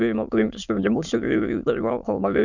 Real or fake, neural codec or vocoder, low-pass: fake; autoencoder, 22.05 kHz, a latent of 192 numbers a frame, VITS, trained on many speakers; 7.2 kHz